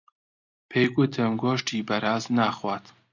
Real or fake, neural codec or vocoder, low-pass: real; none; 7.2 kHz